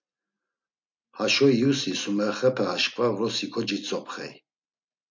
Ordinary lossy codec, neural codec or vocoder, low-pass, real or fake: MP3, 48 kbps; none; 7.2 kHz; real